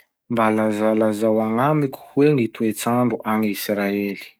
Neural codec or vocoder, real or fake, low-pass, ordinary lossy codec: codec, 44.1 kHz, 7.8 kbps, DAC; fake; none; none